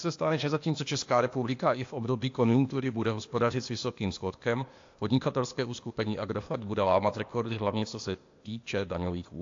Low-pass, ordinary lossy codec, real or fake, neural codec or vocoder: 7.2 kHz; AAC, 48 kbps; fake; codec, 16 kHz, 0.8 kbps, ZipCodec